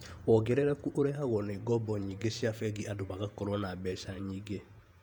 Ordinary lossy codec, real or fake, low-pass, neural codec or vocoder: none; fake; 19.8 kHz; vocoder, 48 kHz, 128 mel bands, Vocos